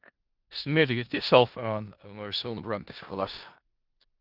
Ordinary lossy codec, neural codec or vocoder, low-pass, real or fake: Opus, 24 kbps; codec, 16 kHz in and 24 kHz out, 0.4 kbps, LongCat-Audio-Codec, four codebook decoder; 5.4 kHz; fake